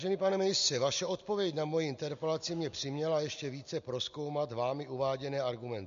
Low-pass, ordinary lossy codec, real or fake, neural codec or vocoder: 7.2 kHz; MP3, 48 kbps; real; none